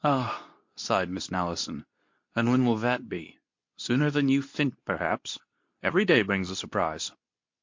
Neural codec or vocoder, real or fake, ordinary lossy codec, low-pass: codec, 24 kHz, 0.9 kbps, WavTokenizer, medium speech release version 2; fake; MP3, 48 kbps; 7.2 kHz